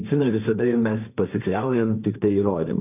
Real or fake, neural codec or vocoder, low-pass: fake; codec, 16 kHz, 1.1 kbps, Voila-Tokenizer; 3.6 kHz